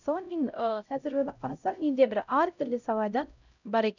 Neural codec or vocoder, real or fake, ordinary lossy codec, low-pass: codec, 16 kHz, 0.5 kbps, X-Codec, HuBERT features, trained on LibriSpeech; fake; none; 7.2 kHz